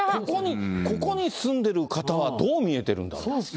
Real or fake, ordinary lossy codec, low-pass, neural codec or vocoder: real; none; none; none